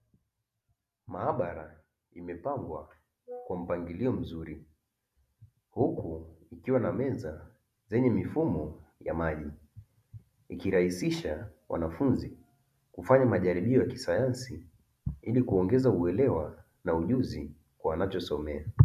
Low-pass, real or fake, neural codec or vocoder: 14.4 kHz; real; none